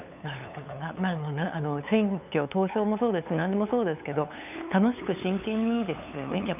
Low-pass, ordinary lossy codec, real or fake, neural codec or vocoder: 3.6 kHz; none; fake; codec, 16 kHz, 8 kbps, FunCodec, trained on LibriTTS, 25 frames a second